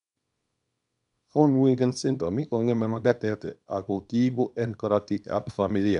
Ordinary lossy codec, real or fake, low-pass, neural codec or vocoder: none; fake; 10.8 kHz; codec, 24 kHz, 0.9 kbps, WavTokenizer, small release